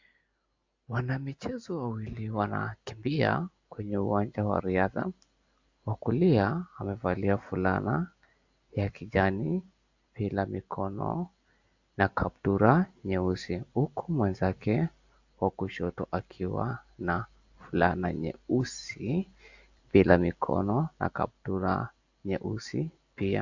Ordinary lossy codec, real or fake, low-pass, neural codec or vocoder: AAC, 48 kbps; real; 7.2 kHz; none